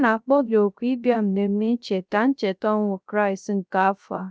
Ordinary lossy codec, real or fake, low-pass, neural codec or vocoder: none; fake; none; codec, 16 kHz, 0.3 kbps, FocalCodec